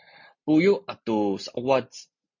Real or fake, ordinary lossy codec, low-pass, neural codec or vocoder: real; MP3, 48 kbps; 7.2 kHz; none